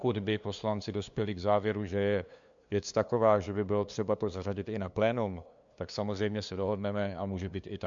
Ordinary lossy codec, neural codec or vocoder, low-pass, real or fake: MP3, 64 kbps; codec, 16 kHz, 2 kbps, FunCodec, trained on LibriTTS, 25 frames a second; 7.2 kHz; fake